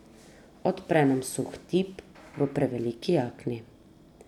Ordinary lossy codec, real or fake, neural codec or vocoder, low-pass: none; real; none; 19.8 kHz